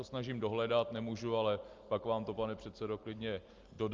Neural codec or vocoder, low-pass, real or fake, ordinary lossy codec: none; 7.2 kHz; real; Opus, 24 kbps